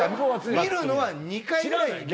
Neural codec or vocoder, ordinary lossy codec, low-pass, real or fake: none; none; none; real